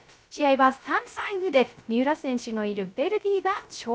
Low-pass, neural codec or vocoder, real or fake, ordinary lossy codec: none; codec, 16 kHz, 0.3 kbps, FocalCodec; fake; none